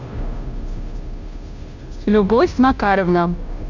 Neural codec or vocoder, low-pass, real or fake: codec, 16 kHz, 0.5 kbps, FunCodec, trained on Chinese and English, 25 frames a second; 7.2 kHz; fake